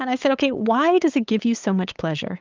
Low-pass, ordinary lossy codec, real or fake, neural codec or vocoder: 7.2 kHz; Opus, 32 kbps; fake; codec, 16 kHz, 8 kbps, FunCodec, trained on LibriTTS, 25 frames a second